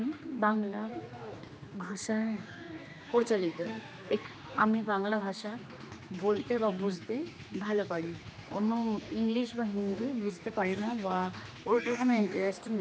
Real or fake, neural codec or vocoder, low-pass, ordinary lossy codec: fake; codec, 16 kHz, 2 kbps, X-Codec, HuBERT features, trained on general audio; none; none